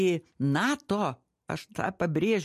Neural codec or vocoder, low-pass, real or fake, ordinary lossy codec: none; 14.4 kHz; real; MP3, 64 kbps